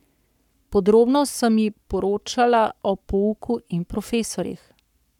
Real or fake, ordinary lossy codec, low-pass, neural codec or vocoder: fake; none; 19.8 kHz; codec, 44.1 kHz, 7.8 kbps, Pupu-Codec